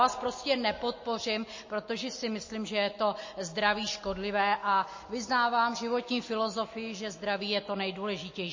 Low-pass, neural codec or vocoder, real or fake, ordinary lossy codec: 7.2 kHz; none; real; MP3, 32 kbps